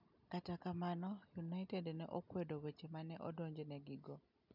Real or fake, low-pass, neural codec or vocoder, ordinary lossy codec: real; 5.4 kHz; none; none